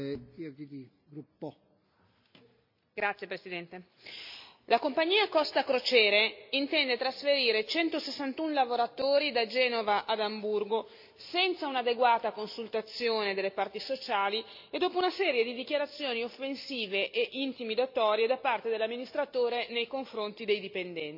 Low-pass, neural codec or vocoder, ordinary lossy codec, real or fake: 5.4 kHz; none; MP3, 48 kbps; real